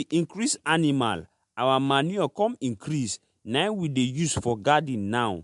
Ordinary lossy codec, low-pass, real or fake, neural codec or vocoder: MP3, 64 kbps; 10.8 kHz; real; none